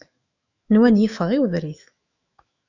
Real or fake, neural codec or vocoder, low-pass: fake; autoencoder, 48 kHz, 128 numbers a frame, DAC-VAE, trained on Japanese speech; 7.2 kHz